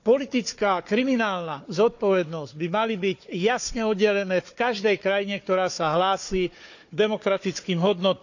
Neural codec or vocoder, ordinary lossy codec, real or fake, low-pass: codec, 16 kHz, 4 kbps, FunCodec, trained on Chinese and English, 50 frames a second; none; fake; 7.2 kHz